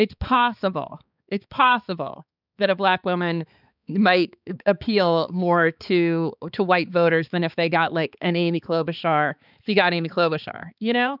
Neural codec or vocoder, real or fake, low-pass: codec, 16 kHz, 4 kbps, X-Codec, HuBERT features, trained on balanced general audio; fake; 5.4 kHz